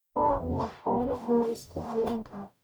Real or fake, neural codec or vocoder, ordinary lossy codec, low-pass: fake; codec, 44.1 kHz, 0.9 kbps, DAC; none; none